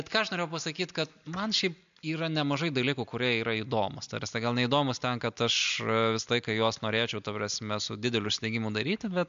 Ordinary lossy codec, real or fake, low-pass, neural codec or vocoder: MP3, 64 kbps; real; 7.2 kHz; none